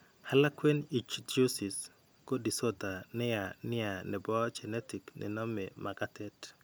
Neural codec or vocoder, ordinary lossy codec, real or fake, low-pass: none; none; real; none